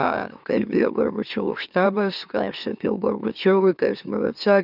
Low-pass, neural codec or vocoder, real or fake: 5.4 kHz; autoencoder, 44.1 kHz, a latent of 192 numbers a frame, MeloTTS; fake